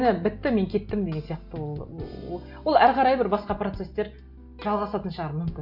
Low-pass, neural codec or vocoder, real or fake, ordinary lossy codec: 5.4 kHz; none; real; none